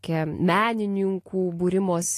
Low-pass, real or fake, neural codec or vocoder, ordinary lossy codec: 14.4 kHz; real; none; AAC, 48 kbps